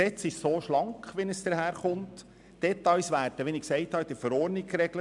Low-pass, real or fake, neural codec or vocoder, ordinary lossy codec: 10.8 kHz; real; none; none